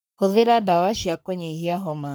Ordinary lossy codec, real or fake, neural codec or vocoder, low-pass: none; fake; codec, 44.1 kHz, 3.4 kbps, Pupu-Codec; none